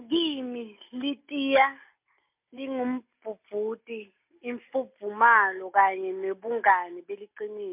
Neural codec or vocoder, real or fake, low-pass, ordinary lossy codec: none; real; 3.6 kHz; MP3, 32 kbps